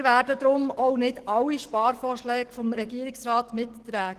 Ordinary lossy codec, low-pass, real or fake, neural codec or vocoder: Opus, 16 kbps; 14.4 kHz; fake; codec, 44.1 kHz, 7.8 kbps, DAC